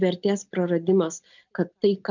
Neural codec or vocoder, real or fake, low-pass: none; real; 7.2 kHz